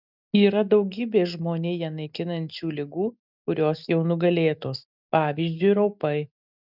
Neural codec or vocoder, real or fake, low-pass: none; real; 5.4 kHz